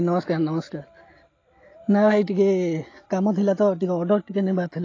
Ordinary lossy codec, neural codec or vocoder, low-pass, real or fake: AAC, 32 kbps; none; 7.2 kHz; real